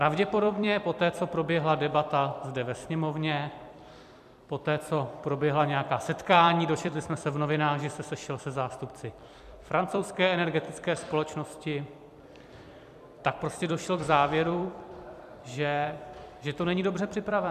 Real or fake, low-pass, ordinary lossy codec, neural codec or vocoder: fake; 14.4 kHz; MP3, 96 kbps; vocoder, 44.1 kHz, 128 mel bands every 256 samples, BigVGAN v2